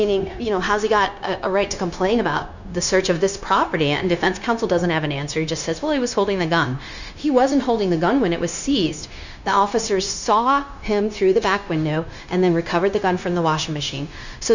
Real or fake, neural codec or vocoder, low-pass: fake; codec, 16 kHz, 0.9 kbps, LongCat-Audio-Codec; 7.2 kHz